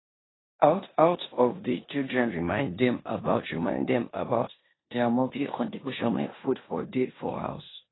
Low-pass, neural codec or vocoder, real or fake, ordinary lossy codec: 7.2 kHz; codec, 16 kHz in and 24 kHz out, 0.9 kbps, LongCat-Audio-Codec, four codebook decoder; fake; AAC, 16 kbps